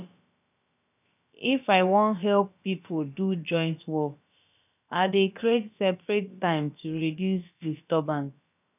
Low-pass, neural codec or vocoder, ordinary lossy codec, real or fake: 3.6 kHz; codec, 16 kHz, about 1 kbps, DyCAST, with the encoder's durations; none; fake